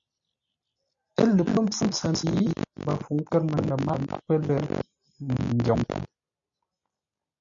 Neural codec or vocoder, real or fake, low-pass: none; real; 7.2 kHz